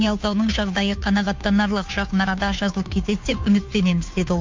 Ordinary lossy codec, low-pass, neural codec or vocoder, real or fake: MP3, 48 kbps; 7.2 kHz; codec, 16 kHz, 2 kbps, FunCodec, trained on Chinese and English, 25 frames a second; fake